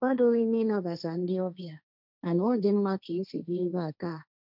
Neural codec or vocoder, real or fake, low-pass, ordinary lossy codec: codec, 16 kHz, 1.1 kbps, Voila-Tokenizer; fake; 5.4 kHz; none